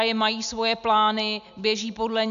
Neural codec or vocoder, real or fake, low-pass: none; real; 7.2 kHz